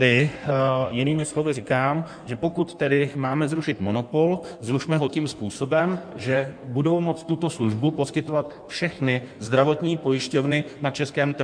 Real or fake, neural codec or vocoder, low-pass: fake; codec, 16 kHz in and 24 kHz out, 1.1 kbps, FireRedTTS-2 codec; 9.9 kHz